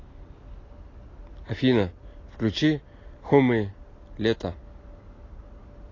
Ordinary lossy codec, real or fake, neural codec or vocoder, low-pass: AAC, 32 kbps; real; none; 7.2 kHz